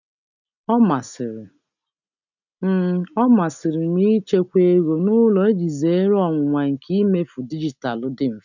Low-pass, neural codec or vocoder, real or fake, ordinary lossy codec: 7.2 kHz; none; real; none